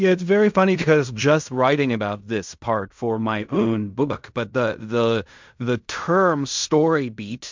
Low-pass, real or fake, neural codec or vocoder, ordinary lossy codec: 7.2 kHz; fake; codec, 16 kHz in and 24 kHz out, 0.4 kbps, LongCat-Audio-Codec, fine tuned four codebook decoder; MP3, 64 kbps